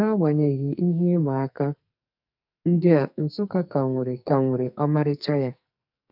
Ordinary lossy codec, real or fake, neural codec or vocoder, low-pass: none; fake; codec, 44.1 kHz, 2.6 kbps, SNAC; 5.4 kHz